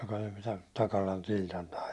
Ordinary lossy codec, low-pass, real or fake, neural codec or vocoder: none; none; real; none